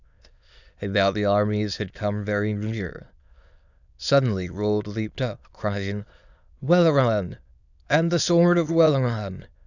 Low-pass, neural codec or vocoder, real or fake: 7.2 kHz; autoencoder, 22.05 kHz, a latent of 192 numbers a frame, VITS, trained on many speakers; fake